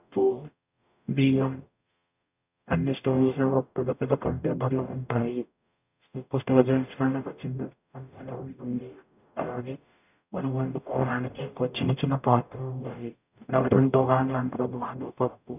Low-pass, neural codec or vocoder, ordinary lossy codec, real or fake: 3.6 kHz; codec, 44.1 kHz, 0.9 kbps, DAC; none; fake